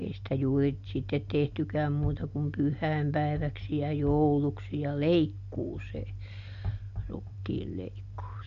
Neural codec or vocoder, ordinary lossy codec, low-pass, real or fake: none; Opus, 64 kbps; 7.2 kHz; real